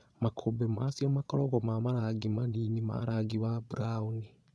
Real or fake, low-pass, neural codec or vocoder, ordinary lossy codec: fake; none; vocoder, 22.05 kHz, 80 mel bands, Vocos; none